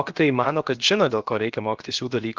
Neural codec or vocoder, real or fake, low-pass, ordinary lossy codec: codec, 16 kHz, about 1 kbps, DyCAST, with the encoder's durations; fake; 7.2 kHz; Opus, 16 kbps